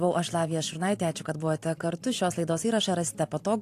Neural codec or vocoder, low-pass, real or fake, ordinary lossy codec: vocoder, 44.1 kHz, 128 mel bands every 512 samples, BigVGAN v2; 14.4 kHz; fake; AAC, 64 kbps